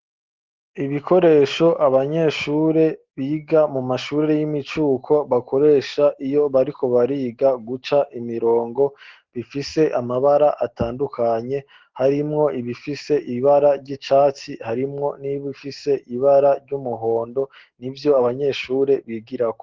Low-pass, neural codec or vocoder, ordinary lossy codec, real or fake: 7.2 kHz; none; Opus, 16 kbps; real